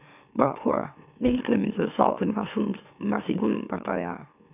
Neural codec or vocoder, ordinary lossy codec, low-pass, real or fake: autoencoder, 44.1 kHz, a latent of 192 numbers a frame, MeloTTS; none; 3.6 kHz; fake